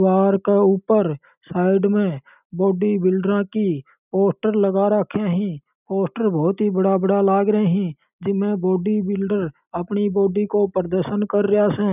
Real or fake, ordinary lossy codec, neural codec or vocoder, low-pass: real; none; none; 3.6 kHz